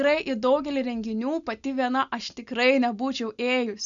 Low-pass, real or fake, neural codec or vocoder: 7.2 kHz; real; none